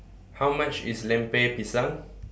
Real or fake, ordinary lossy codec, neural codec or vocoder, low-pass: real; none; none; none